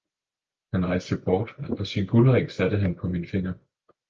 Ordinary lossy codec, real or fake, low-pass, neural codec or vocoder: Opus, 24 kbps; real; 7.2 kHz; none